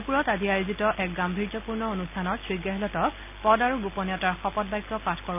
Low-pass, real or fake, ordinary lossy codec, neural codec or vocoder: 3.6 kHz; real; none; none